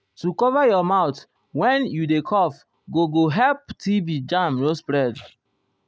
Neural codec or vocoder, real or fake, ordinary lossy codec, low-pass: none; real; none; none